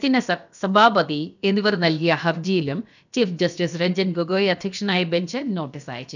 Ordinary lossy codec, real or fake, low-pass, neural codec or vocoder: none; fake; 7.2 kHz; codec, 16 kHz, about 1 kbps, DyCAST, with the encoder's durations